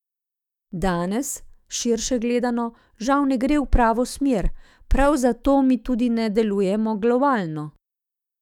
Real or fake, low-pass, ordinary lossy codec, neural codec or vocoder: fake; 19.8 kHz; none; autoencoder, 48 kHz, 128 numbers a frame, DAC-VAE, trained on Japanese speech